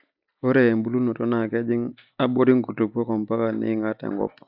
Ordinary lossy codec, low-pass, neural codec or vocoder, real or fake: none; 5.4 kHz; none; real